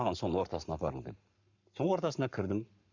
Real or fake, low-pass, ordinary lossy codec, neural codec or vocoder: fake; 7.2 kHz; none; codec, 44.1 kHz, 7.8 kbps, Pupu-Codec